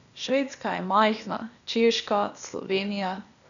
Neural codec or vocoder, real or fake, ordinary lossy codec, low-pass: codec, 16 kHz, 0.8 kbps, ZipCodec; fake; none; 7.2 kHz